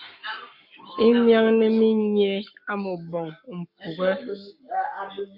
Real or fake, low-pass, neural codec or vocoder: fake; 5.4 kHz; codec, 44.1 kHz, 7.8 kbps, Pupu-Codec